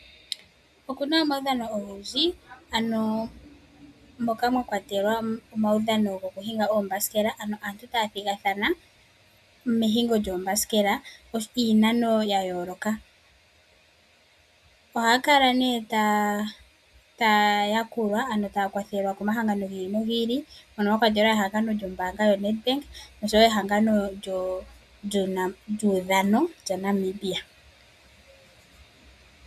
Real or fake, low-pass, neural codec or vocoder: real; 14.4 kHz; none